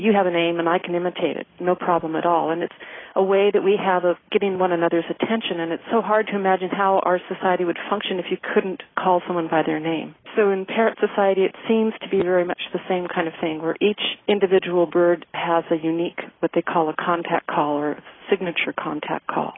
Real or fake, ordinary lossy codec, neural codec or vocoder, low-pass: real; AAC, 16 kbps; none; 7.2 kHz